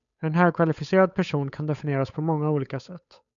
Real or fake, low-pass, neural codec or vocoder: fake; 7.2 kHz; codec, 16 kHz, 8 kbps, FunCodec, trained on Chinese and English, 25 frames a second